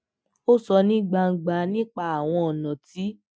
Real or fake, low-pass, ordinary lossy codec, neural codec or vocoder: real; none; none; none